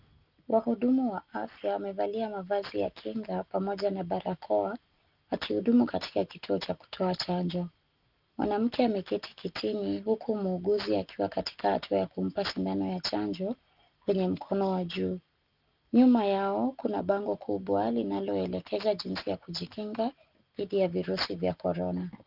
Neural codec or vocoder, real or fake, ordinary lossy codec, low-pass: none; real; Opus, 32 kbps; 5.4 kHz